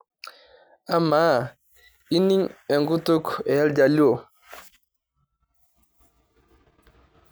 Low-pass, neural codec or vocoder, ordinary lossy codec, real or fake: none; none; none; real